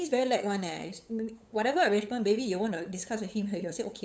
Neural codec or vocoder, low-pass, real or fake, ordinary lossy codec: codec, 16 kHz, 8 kbps, FunCodec, trained on LibriTTS, 25 frames a second; none; fake; none